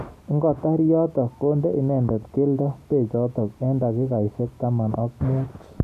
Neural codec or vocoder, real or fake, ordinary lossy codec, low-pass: none; real; none; 14.4 kHz